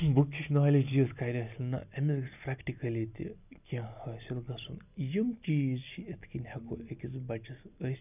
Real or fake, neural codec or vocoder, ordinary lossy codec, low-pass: real; none; none; 3.6 kHz